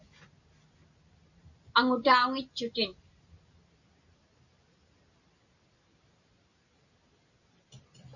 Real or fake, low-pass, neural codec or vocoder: real; 7.2 kHz; none